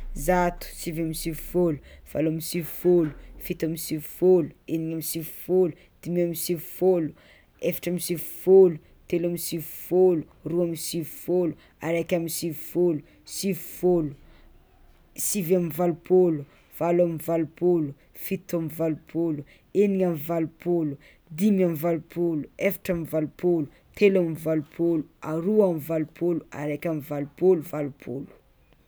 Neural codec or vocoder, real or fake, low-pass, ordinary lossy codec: none; real; none; none